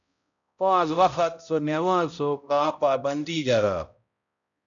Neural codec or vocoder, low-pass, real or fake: codec, 16 kHz, 0.5 kbps, X-Codec, HuBERT features, trained on balanced general audio; 7.2 kHz; fake